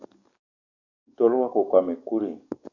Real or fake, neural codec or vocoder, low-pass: fake; codec, 44.1 kHz, 7.8 kbps, DAC; 7.2 kHz